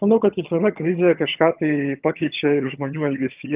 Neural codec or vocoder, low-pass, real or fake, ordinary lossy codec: vocoder, 22.05 kHz, 80 mel bands, HiFi-GAN; 3.6 kHz; fake; Opus, 32 kbps